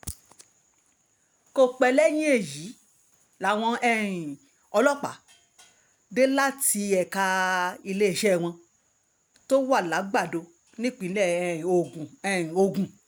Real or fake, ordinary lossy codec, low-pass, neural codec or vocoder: real; none; none; none